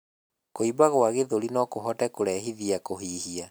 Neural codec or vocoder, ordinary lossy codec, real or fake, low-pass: none; none; real; none